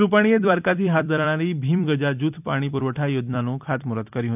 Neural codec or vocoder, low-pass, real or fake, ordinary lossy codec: vocoder, 44.1 kHz, 128 mel bands every 256 samples, BigVGAN v2; 3.6 kHz; fake; none